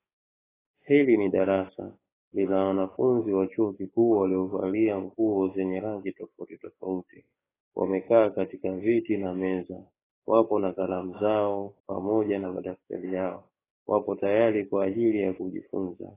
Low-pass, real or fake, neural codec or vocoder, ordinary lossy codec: 3.6 kHz; fake; codec, 44.1 kHz, 7.8 kbps, DAC; AAC, 16 kbps